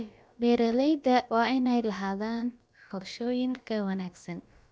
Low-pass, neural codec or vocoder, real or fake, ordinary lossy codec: none; codec, 16 kHz, about 1 kbps, DyCAST, with the encoder's durations; fake; none